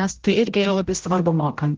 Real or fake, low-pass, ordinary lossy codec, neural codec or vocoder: fake; 7.2 kHz; Opus, 16 kbps; codec, 16 kHz, 0.5 kbps, X-Codec, HuBERT features, trained on general audio